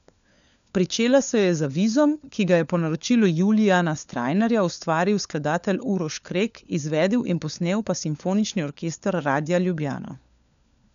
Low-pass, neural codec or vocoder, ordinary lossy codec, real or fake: 7.2 kHz; codec, 16 kHz, 4 kbps, FunCodec, trained on LibriTTS, 50 frames a second; none; fake